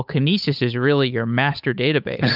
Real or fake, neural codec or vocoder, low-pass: fake; codec, 16 kHz, 8 kbps, FunCodec, trained on Chinese and English, 25 frames a second; 5.4 kHz